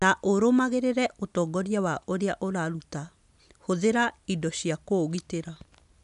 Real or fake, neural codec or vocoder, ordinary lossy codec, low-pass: real; none; none; 10.8 kHz